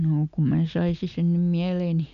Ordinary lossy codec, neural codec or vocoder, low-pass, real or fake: none; none; 7.2 kHz; real